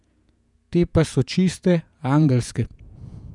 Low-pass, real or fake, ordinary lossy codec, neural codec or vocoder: 10.8 kHz; fake; none; vocoder, 48 kHz, 128 mel bands, Vocos